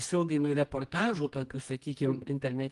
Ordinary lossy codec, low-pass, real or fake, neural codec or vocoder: Opus, 24 kbps; 10.8 kHz; fake; codec, 24 kHz, 0.9 kbps, WavTokenizer, medium music audio release